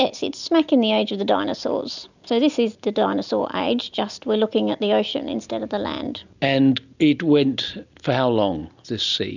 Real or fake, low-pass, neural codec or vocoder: real; 7.2 kHz; none